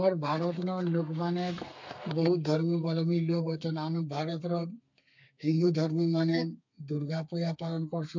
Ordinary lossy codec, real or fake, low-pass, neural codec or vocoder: MP3, 48 kbps; fake; 7.2 kHz; codec, 32 kHz, 1.9 kbps, SNAC